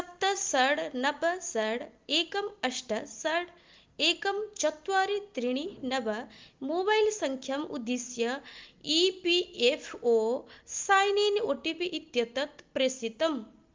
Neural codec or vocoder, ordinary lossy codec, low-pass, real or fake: none; Opus, 32 kbps; 7.2 kHz; real